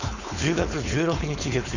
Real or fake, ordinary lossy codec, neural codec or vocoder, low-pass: fake; none; codec, 16 kHz, 4.8 kbps, FACodec; 7.2 kHz